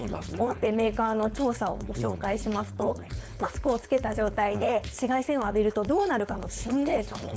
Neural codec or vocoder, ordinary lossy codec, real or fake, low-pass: codec, 16 kHz, 4.8 kbps, FACodec; none; fake; none